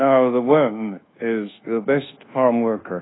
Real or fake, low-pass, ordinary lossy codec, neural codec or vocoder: fake; 7.2 kHz; AAC, 16 kbps; codec, 24 kHz, 1.2 kbps, DualCodec